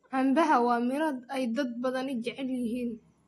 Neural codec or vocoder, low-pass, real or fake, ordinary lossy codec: none; 19.8 kHz; real; AAC, 32 kbps